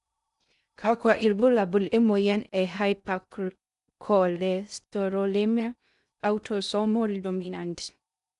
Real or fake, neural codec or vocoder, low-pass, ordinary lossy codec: fake; codec, 16 kHz in and 24 kHz out, 0.6 kbps, FocalCodec, streaming, 2048 codes; 10.8 kHz; none